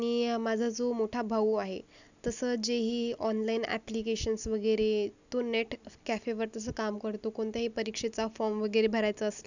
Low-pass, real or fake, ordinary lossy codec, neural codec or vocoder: 7.2 kHz; real; none; none